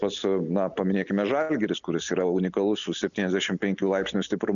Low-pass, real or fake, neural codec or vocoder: 7.2 kHz; real; none